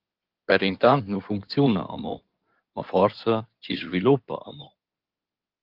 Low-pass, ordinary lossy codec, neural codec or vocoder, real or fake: 5.4 kHz; Opus, 32 kbps; codec, 16 kHz in and 24 kHz out, 2.2 kbps, FireRedTTS-2 codec; fake